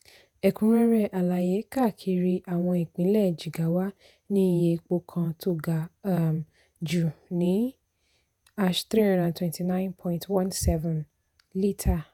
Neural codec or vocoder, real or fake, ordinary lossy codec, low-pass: vocoder, 48 kHz, 128 mel bands, Vocos; fake; none; 19.8 kHz